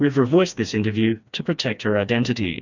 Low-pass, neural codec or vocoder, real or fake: 7.2 kHz; codec, 16 kHz, 2 kbps, FreqCodec, smaller model; fake